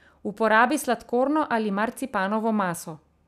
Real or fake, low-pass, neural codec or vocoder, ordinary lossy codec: real; 14.4 kHz; none; none